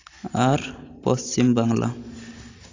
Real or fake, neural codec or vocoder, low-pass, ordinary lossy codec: real; none; 7.2 kHz; AAC, 32 kbps